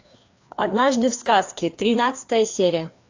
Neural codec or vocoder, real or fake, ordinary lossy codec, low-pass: codec, 16 kHz, 2 kbps, FreqCodec, larger model; fake; AAC, 48 kbps; 7.2 kHz